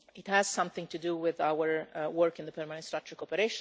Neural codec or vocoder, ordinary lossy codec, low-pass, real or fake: none; none; none; real